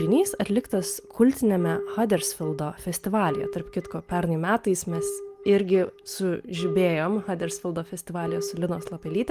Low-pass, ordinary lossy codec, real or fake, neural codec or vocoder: 14.4 kHz; Opus, 32 kbps; real; none